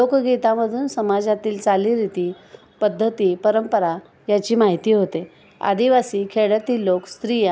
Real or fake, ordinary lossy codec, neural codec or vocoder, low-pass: real; none; none; none